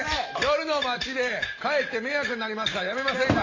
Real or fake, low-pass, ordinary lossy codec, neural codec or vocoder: real; 7.2 kHz; AAC, 32 kbps; none